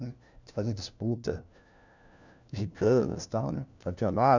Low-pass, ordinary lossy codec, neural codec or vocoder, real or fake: 7.2 kHz; none; codec, 16 kHz, 1 kbps, FunCodec, trained on LibriTTS, 50 frames a second; fake